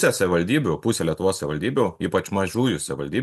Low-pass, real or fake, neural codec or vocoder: 14.4 kHz; real; none